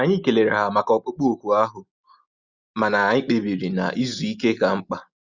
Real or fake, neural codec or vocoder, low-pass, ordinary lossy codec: real; none; 7.2 kHz; Opus, 64 kbps